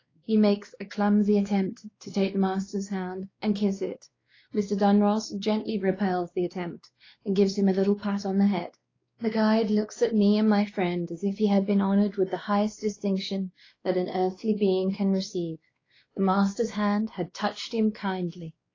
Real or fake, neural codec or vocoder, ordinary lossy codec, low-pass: fake; codec, 16 kHz, 2 kbps, X-Codec, WavLM features, trained on Multilingual LibriSpeech; AAC, 32 kbps; 7.2 kHz